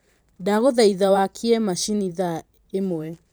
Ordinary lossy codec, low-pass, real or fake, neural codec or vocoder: none; none; fake; vocoder, 44.1 kHz, 128 mel bands every 512 samples, BigVGAN v2